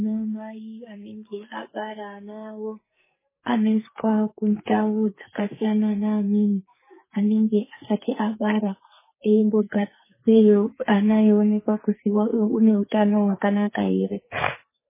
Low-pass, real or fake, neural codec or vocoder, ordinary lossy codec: 3.6 kHz; fake; codec, 44.1 kHz, 2.6 kbps, SNAC; MP3, 16 kbps